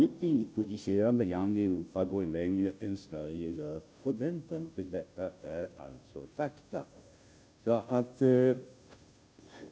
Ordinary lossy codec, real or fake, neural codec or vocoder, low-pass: none; fake; codec, 16 kHz, 0.5 kbps, FunCodec, trained on Chinese and English, 25 frames a second; none